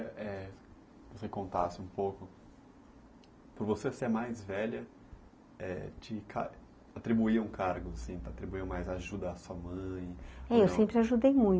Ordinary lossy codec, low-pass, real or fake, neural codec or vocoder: none; none; real; none